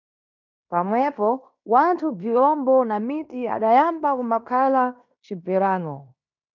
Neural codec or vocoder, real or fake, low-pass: codec, 16 kHz in and 24 kHz out, 0.9 kbps, LongCat-Audio-Codec, fine tuned four codebook decoder; fake; 7.2 kHz